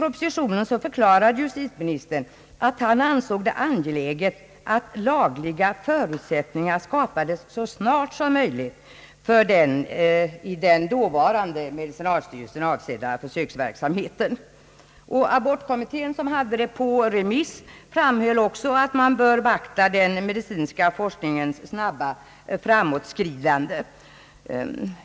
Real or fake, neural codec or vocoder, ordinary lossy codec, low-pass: real; none; none; none